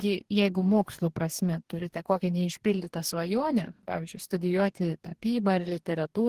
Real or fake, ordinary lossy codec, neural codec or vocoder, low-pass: fake; Opus, 16 kbps; codec, 44.1 kHz, 2.6 kbps, DAC; 14.4 kHz